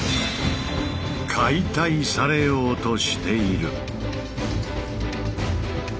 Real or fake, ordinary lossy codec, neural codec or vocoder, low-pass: real; none; none; none